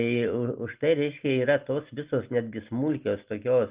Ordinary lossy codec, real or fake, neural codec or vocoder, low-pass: Opus, 32 kbps; real; none; 3.6 kHz